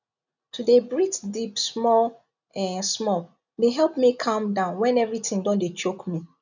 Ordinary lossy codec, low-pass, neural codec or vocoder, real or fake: none; 7.2 kHz; none; real